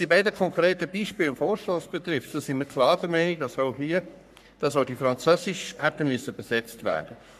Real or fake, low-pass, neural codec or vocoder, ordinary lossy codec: fake; 14.4 kHz; codec, 44.1 kHz, 3.4 kbps, Pupu-Codec; none